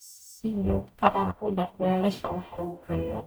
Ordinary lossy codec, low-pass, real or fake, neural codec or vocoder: none; none; fake; codec, 44.1 kHz, 0.9 kbps, DAC